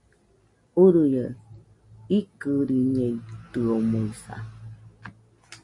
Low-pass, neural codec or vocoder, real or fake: 10.8 kHz; none; real